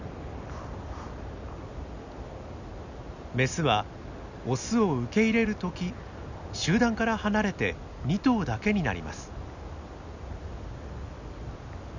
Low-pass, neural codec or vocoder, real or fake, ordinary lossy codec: 7.2 kHz; none; real; none